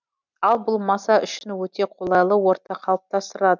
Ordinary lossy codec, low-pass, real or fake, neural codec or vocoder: none; 7.2 kHz; real; none